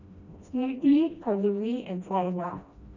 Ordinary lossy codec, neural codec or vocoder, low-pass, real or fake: none; codec, 16 kHz, 1 kbps, FreqCodec, smaller model; 7.2 kHz; fake